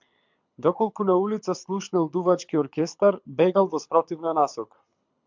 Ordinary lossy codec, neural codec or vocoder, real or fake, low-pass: MP3, 64 kbps; codec, 24 kHz, 6 kbps, HILCodec; fake; 7.2 kHz